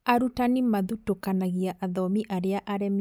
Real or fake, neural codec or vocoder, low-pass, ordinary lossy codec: real; none; none; none